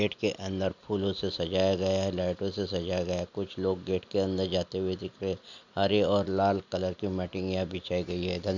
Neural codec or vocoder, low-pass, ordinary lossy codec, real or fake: none; 7.2 kHz; none; real